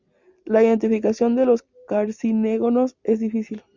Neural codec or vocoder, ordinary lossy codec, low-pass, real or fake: none; Opus, 64 kbps; 7.2 kHz; real